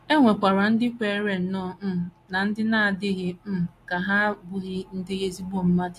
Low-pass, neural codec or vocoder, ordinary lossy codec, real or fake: 14.4 kHz; none; AAC, 64 kbps; real